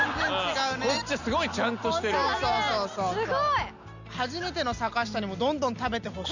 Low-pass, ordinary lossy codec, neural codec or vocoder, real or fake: 7.2 kHz; none; none; real